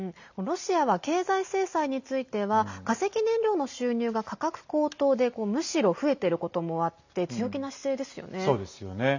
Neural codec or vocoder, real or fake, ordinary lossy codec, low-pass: none; real; none; 7.2 kHz